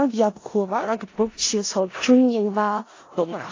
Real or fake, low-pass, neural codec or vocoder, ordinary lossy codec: fake; 7.2 kHz; codec, 16 kHz in and 24 kHz out, 0.4 kbps, LongCat-Audio-Codec, four codebook decoder; AAC, 32 kbps